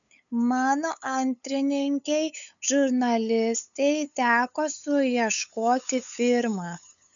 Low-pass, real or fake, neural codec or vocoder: 7.2 kHz; fake; codec, 16 kHz, 8 kbps, FunCodec, trained on LibriTTS, 25 frames a second